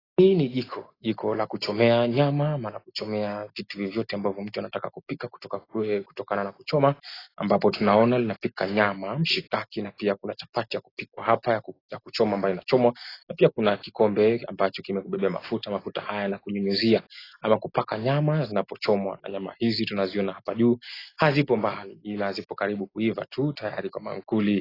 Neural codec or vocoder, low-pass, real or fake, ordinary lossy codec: none; 5.4 kHz; real; AAC, 24 kbps